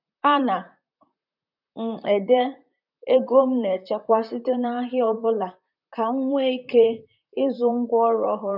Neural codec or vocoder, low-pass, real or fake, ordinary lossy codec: vocoder, 44.1 kHz, 128 mel bands, Pupu-Vocoder; 5.4 kHz; fake; none